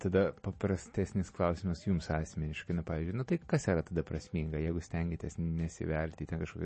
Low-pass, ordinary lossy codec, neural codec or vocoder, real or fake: 10.8 kHz; MP3, 32 kbps; none; real